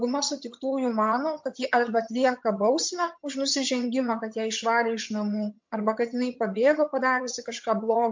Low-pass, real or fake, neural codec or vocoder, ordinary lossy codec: 7.2 kHz; fake; vocoder, 22.05 kHz, 80 mel bands, HiFi-GAN; MP3, 48 kbps